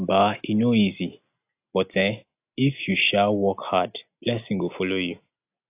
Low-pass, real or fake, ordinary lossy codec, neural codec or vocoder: 3.6 kHz; real; AAC, 32 kbps; none